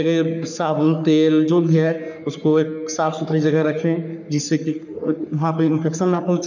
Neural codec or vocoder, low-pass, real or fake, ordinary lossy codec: codec, 44.1 kHz, 3.4 kbps, Pupu-Codec; 7.2 kHz; fake; none